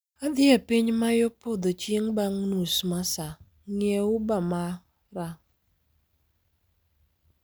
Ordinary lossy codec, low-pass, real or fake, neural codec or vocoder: none; none; real; none